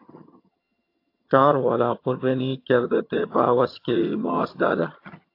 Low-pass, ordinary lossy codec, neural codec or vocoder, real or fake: 5.4 kHz; AAC, 32 kbps; vocoder, 22.05 kHz, 80 mel bands, HiFi-GAN; fake